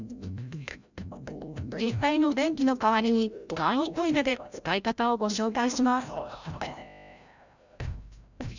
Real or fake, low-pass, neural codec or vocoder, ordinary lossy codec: fake; 7.2 kHz; codec, 16 kHz, 0.5 kbps, FreqCodec, larger model; none